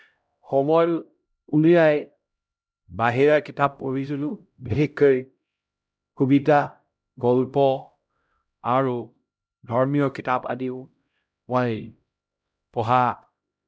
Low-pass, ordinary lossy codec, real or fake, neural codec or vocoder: none; none; fake; codec, 16 kHz, 0.5 kbps, X-Codec, HuBERT features, trained on LibriSpeech